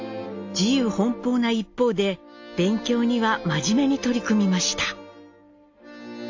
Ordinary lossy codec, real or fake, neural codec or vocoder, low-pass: AAC, 48 kbps; real; none; 7.2 kHz